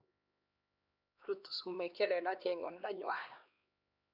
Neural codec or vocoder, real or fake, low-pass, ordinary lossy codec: codec, 16 kHz, 2 kbps, X-Codec, HuBERT features, trained on LibriSpeech; fake; 5.4 kHz; none